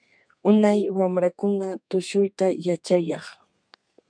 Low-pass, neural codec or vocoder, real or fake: 9.9 kHz; codec, 32 kHz, 1.9 kbps, SNAC; fake